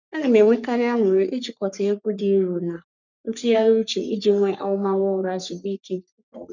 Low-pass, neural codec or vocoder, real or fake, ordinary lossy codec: 7.2 kHz; codec, 44.1 kHz, 3.4 kbps, Pupu-Codec; fake; none